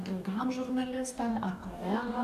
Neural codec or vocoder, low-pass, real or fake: codec, 44.1 kHz, 2.6 kbps, DAC; 14.4 kHz; fake